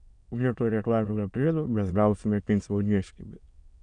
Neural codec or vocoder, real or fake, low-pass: autoencoder, 22.05 kHz, a latent of 192 numbers a frame, VITS, trained on many speakers; fake; 9.9 kHz